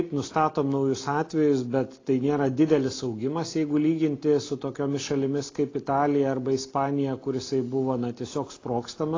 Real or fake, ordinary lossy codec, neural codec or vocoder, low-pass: real; AAC, 32 kbps; none; 7.2 kHz